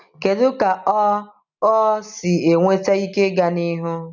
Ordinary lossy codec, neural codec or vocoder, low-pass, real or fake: none; none; 7.2 kHz; real